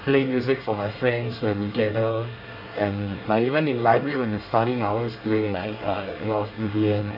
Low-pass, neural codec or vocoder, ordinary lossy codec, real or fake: 5.4 kHz; codec, 24 kHz, 1 kbps, SNAC; none; fake